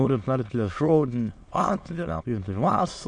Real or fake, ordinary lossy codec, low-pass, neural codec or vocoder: fake; MP3, 48 kbps; 9.9 kHz; autoencoder, 22.05 kHz, a latent of 192 numbers a frame, VITS, trained on many speakers